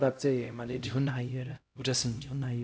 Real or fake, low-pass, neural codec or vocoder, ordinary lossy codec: fake; none; codec, 16 kHz, 0.5 kbps, X-Codec, HuBERT features, trained on LibriSpeech; none